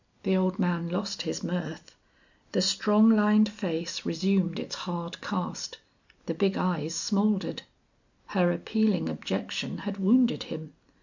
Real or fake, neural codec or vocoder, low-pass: real; none; 7.2 kHz